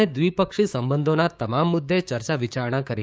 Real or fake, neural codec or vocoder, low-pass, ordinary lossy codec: fake; codec, 16 kHz, 6 kbps, DAC; none; none